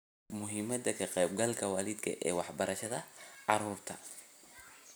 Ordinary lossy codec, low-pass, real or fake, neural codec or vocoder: none; none; real; none